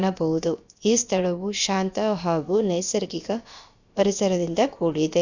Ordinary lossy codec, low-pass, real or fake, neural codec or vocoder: Opus, 64 kbps; 7.2 kHz; fake; codec, 16 kHz, about 1 kbps, DyCAST, with the encoder's durations